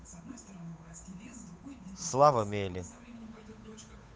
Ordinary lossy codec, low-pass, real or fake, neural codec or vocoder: none; none; fake; codec, 16 kHz, 8 kbps, FunCodec, trained on Chinese and English, 25 frames a second